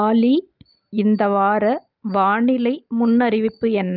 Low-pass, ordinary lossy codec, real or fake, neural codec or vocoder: 5.4 kHz; Opus, 24 kbps; real; none